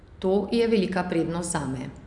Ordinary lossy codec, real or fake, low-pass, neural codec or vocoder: none; real; 10.8 kHz; none